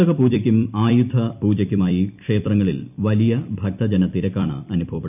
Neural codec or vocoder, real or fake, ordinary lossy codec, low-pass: vocoder, 44.1 kHz, 128 mel bands every 256 samples, BigVGAN v2; fake; none; 3.6 kHz